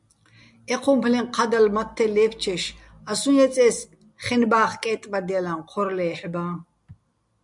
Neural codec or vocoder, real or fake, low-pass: none; real; 10.8 kHz